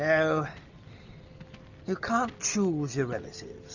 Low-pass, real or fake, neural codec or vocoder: 7.2 kHz; real; none